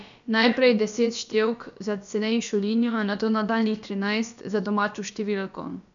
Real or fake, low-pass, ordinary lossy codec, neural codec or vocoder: fake; 7.2 kHz; none; codec, 16 kHz, about 1 kbps, DyCAST, with the encoder's durations